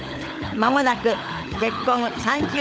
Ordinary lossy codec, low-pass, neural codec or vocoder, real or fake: none; none; codec, 16 kHz, 16 kbps, FunCodec, trained on LibriTTS, 50 frames a second; fake